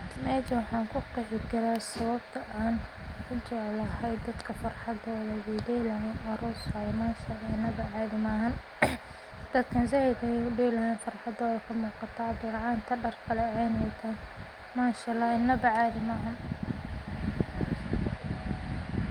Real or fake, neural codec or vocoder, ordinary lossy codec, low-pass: real; none; Opus, 32 kbps; 19.8 kHz